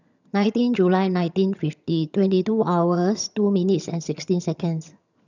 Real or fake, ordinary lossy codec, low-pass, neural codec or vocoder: fake; none; 7.2 kHz; vocoder, 22.05 kHz, 80 mel bands, HiFi-GAN